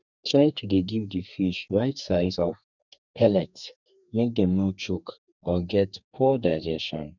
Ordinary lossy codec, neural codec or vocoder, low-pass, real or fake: none; codec, 32 kHz, 1.9 kbps, SNAC; 7.2 kHz; fake